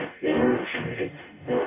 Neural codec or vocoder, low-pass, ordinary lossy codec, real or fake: codec, 44.1 kHz, 0.9 kbps, DAC; 3.6 kHz; none; fake